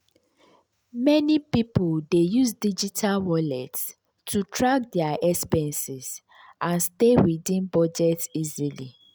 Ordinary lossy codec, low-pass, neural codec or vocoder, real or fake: none; none; none; real